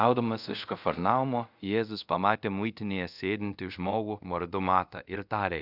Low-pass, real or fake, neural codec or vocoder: 5.4 kHz; fake; codec, 24 kHz, 0.5 kbps, DualCodec